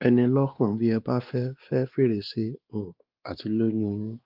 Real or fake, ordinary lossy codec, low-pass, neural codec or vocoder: fake; Opus, 24 kbps; 5.4 kHz; codec, 16 kHz, 2 kbps, X-Codec, WavLM features, trained on Multilingual LibriSpeech